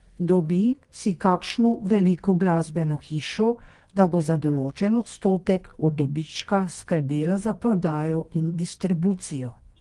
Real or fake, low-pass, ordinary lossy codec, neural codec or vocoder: fake; 10.8 kHz; Opus, 24 kbps; codec, 24 kHz, 0.9 kbps, WavTokenizer, medium music audio release